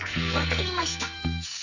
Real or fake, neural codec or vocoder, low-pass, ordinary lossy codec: fake; codec, 44.1 kHz, 2.6 kbps, SNAC; 7.2 kHz; none